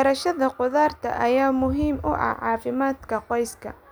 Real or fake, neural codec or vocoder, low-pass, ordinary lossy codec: real; none; none; none